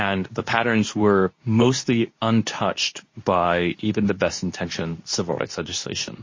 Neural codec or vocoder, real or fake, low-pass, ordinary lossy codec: codec, 24 kHz, 0.9 kbps, WavTokenizer, medium speech release version 2; fake; 7.2 kHz; MP3, 32 kbps